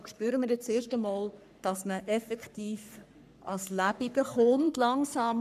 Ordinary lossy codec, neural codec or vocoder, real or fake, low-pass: none; codec, 44.1 kHz, 3.4 kbps, Pupu-Codec; fake; 14.4 kHz